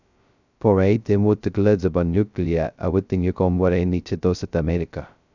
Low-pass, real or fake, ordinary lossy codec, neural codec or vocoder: 7.2 kHz; fake; none; codec, 16 kHz, 0.2 kbps, FocalCodec